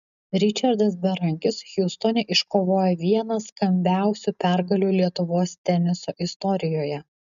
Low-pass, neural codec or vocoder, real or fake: 7.2 kHz; none; real